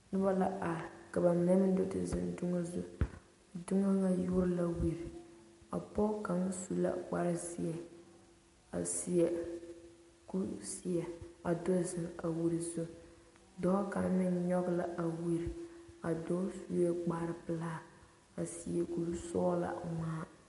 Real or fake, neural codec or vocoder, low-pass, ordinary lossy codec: real; none; 14.4 kHz; MP3, 48 kbps